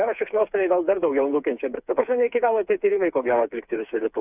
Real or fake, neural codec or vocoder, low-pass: fake; codec, 16 kHz, 4 kbps, FreqCodec, smaller model; 3.6 kHz